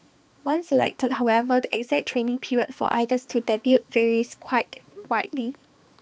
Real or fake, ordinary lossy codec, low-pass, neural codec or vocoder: fake; none; none; codec, 16 kHz, 2 kbps, X-Codec, HuBERT features, trained on balanced general audio